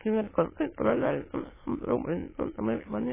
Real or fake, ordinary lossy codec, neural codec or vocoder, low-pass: fake; MP3, 16 kbps; autoencoder, 22.05 kHz, a latent of 192 numbers a frame, VITS, trained on many speakers; 3.6 kHz